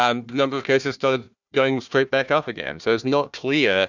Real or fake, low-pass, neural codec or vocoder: fake; 7.2 kHz; codec, 16 kHz, 1 kbps, FunCodec, trained on Chinese and English, 50 frames a second